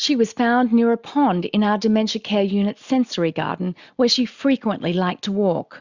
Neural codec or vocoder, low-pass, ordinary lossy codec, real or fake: none; 7.2 kHz; Opus, 64 kbps; real